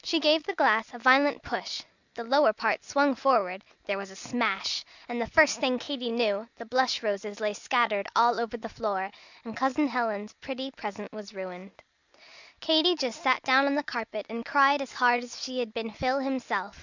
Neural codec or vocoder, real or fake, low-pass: none; real; 7.2 kHz